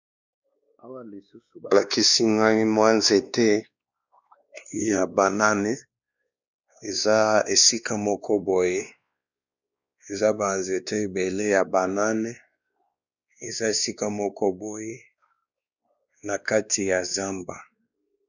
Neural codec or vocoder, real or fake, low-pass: codec, 16 kHz, 2 kbps, X-Codec, WavLM features, trained on Multilingual LibriSpeech; fake; 7.2 kHz